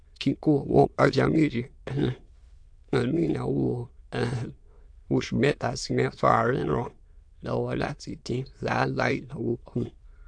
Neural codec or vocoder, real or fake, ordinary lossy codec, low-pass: autoencoder, 22.05 kHz, a latent of 192 numbers a frame, VITS, trained on many speakers; fake; AAC, 64 kbps; 9.9 kHz